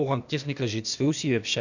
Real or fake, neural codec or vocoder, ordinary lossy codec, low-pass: fake; codec, 16 kHz, 0.8 kbps, ZipCodec; none; 7.2 kHz